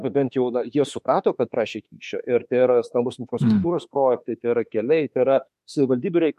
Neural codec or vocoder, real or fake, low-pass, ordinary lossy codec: autoencoder, 48 kHz, 32 numbers a frame, DAC-VAE, trained on Japanese speech; fake; 14.4 kHz; MP3, 64 kbps